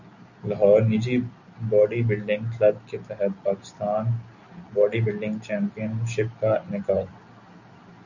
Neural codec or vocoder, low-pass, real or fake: none; 7.2 kHz; real